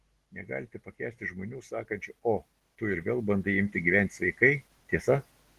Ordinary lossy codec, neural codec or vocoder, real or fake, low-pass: Opus, 16 kbps; none; real; 14.4 kHz